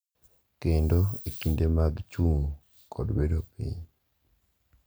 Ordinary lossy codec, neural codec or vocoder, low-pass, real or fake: none; none; none; real